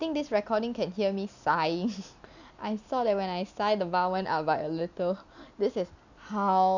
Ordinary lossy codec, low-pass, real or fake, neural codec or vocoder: none; 7.2 kHz; real; none